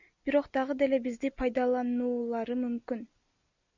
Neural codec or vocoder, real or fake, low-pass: none; real; 7.2 kHz